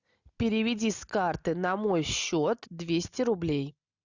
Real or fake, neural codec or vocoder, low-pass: real; none; 7.2 kHz